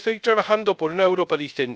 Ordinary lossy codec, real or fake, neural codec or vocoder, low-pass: none; fake; codec, 16 kHz, 0.3 kbps, FocalCodec; none